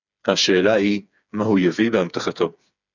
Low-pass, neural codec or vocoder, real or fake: 7.2 kHz; codec, 16 kHz, 4 kbps, FreqCodec, smaller model; fake